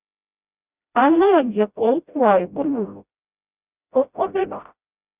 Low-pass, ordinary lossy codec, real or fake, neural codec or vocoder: 3.6 kHz; Opus, 64 kbps; fake; codec, 16 kHz, 0.5 kbps, FreqCodec, smaller model